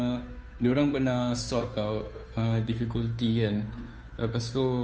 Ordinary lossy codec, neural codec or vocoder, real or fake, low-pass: none; codec, 16 kHz, 2 kbps, FunCodec, trained on Chinese and English, 25 frames a second; fake; none